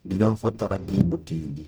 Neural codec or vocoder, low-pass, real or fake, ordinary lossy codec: codec, 44.1 kHz, 0.9 kbps, DAC; none; fake; none